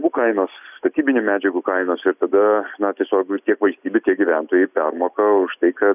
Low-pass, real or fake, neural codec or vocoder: 3.6 kHz; real; none